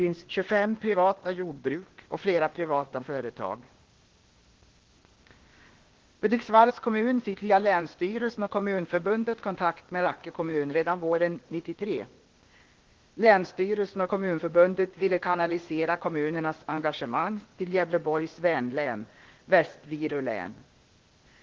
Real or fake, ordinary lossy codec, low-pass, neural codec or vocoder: fake; Opus, 16 kbps; 7.2 kHz; codec, 16 kHz, 0.8 kbps, ZipCodec